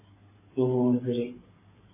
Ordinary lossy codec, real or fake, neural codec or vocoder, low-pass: MP3, 16 kbps; fake; codec, 16 kHz, 8 kbps, FreqCodec, smaller model; 3.6 kHz